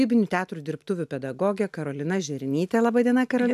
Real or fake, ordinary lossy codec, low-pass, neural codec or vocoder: real; AAC, 96 kbps; 14.4 kHz; none